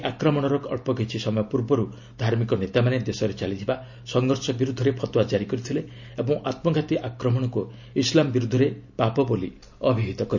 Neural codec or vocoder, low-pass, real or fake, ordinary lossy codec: none; 7.2 kHz; real; none